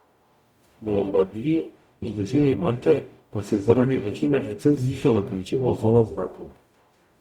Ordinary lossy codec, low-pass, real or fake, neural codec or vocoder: Opus, 64 kbps; 19.8 kHz; fake; codec, 44.1 kHz, 0.9 kbps, DAC